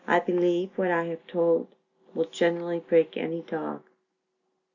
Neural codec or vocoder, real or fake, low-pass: none; real; 7.2 kHz